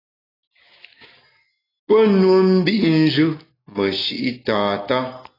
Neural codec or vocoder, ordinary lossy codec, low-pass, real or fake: none; AAC, 24 kbps; 5.4 kHz; real